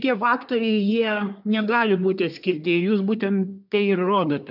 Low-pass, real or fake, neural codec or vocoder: 5.4 kHz; fake; codec, 24 kHz, 1 kbps, SNAC